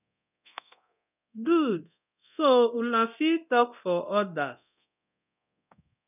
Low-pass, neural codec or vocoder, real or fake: 3.6 kHz; codec, 24 kHz, 0.9 kbps, DualCodec; fake